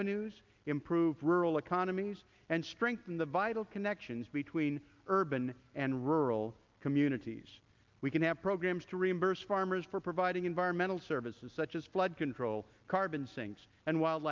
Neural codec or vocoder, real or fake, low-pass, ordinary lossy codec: none; real; 7.2 kHz; Opus, 24 kbps